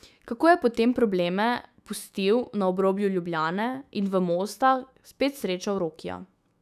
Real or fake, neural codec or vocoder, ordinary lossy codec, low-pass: fake; autoencoder, 48 kHz, 128 numbers a frame, DAC-VAE, trained on Japanese speech; none; 14.4 kHz